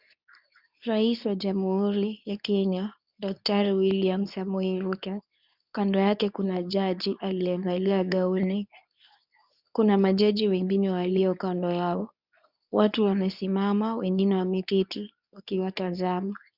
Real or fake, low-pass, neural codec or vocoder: fake; 5.4 kHz; codec, 24 kHz, 0.9 kbps, WavTokenizer, medium speech release version 2